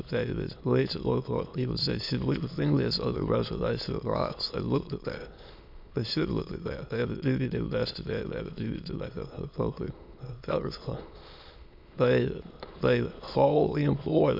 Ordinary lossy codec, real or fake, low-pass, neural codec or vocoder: MP3, 48 kbps; fake; 5.4 kHz; autoencoder, 22.05 kHz, a latent of 192 numbers a frame, VITS, trained on many speakers